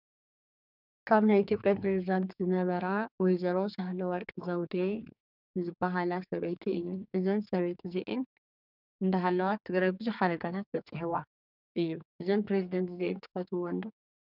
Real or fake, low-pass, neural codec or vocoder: fake; 5.4 kHz; codec, 44.1 kHz, 2.6 kbps, SNAC